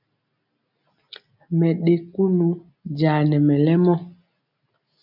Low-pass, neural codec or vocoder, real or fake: 5.4 kHz; none; real